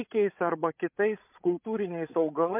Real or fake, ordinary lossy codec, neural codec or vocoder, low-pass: fake; MP3, 32 kbps; codec, 16 kHz, 16 kbps, FreqCodec, larger model; 3.6 kHz